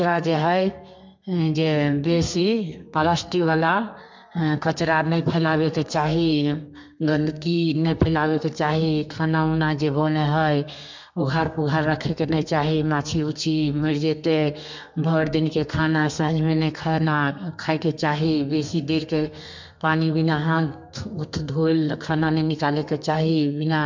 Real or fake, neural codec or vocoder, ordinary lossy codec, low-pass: fake; codec, 32 kHz, 1.9 kbps, SNAC; MP3, 64 kbps; 7.2 kHz